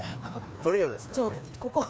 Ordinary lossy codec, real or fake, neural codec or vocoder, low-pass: none; fake; codec, 16 kHz, 2 kbps, FreqCodec, larger model; none